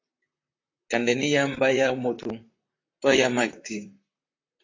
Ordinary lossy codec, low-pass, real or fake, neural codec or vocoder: AAC, 32 kbps; 7.2 kHz; fake; vocoder, 44.1 kHz, 128 mel bands, Pupu-Vocoder